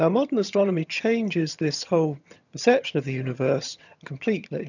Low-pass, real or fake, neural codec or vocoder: 7.2 kHz; fake; vocoder, 22.05 kHz, 80 mel bands, HiFi-GAN